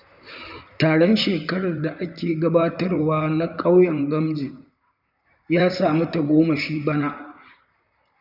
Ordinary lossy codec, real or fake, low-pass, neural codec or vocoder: none; fake; 5.4 kHz; vocoder, 44.1 kHz, 128 mel bands, Pupu-Vocoder